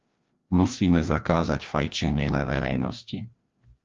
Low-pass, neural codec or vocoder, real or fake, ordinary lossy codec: 7.2 kHz; codec, 16 kHz, 1 kbps, FreqCodec, larger model; fake; Opus, 32 kbps